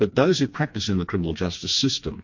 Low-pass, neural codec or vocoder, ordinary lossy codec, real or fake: 7.2 kHz; codec, 16 kHz, 2 kbps, FreqCodec, smaller model; MP3, 48 kbps; fake